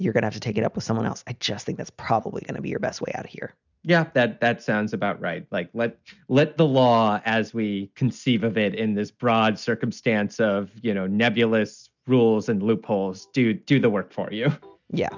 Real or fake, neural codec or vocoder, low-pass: real; none; 7.2 kHz